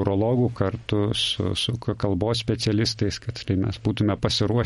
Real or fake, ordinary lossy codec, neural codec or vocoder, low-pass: real; MP3, 48 kbps; none; 19.8 kHz